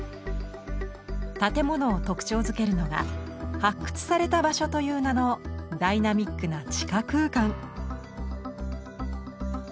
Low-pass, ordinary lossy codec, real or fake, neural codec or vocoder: none; none; real; none